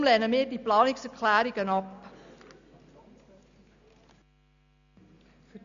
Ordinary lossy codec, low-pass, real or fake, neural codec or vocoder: none; 7.2 kHz; real; none